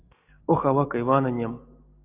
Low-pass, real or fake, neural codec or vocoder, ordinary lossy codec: 3.6 kHz; real; none; AAC, 32 kbps